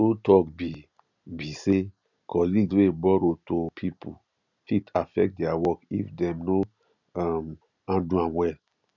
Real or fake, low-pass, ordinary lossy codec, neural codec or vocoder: real; 7.2 kHz; none; none